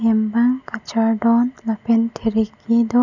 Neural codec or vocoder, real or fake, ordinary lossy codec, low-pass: none; real; none; 7.2 kHz